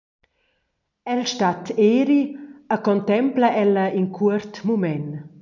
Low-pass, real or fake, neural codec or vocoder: 7.2 kHz; real; none